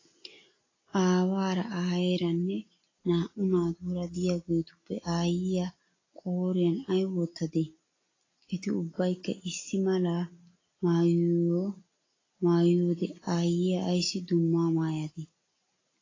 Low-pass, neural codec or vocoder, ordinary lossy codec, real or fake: 7.2 kHz; none; AAC, 32 kbps; real